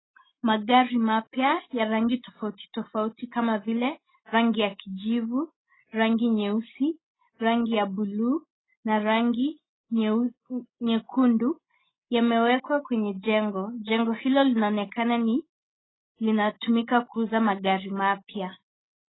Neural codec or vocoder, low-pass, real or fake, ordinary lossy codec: none; 7.2 kHz; real; AAC, 16 kbps